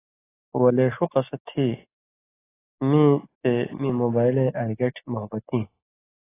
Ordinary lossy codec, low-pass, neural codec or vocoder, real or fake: AAC, 16 kbps; 3.6 kHz; none; real